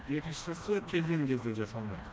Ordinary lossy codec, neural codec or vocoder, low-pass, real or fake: none; codec, 16 kHz, 1 kbps, FreqCodec, smaller model; none; fake